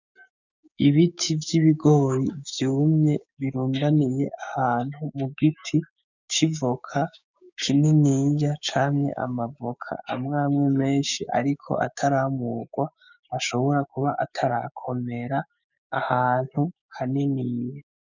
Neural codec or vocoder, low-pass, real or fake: codec, 16 kHz, 6 kbps, DAC; 7.2 kHz; fake